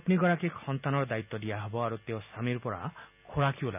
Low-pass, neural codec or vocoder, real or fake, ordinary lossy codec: 3.6 kHz; none; real; none